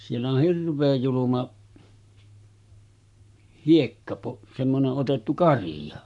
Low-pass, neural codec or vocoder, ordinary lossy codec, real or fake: 10.8 kHz; codec, 44.1 kHz, 7.8 kbps, Pupu-Codec; none; fake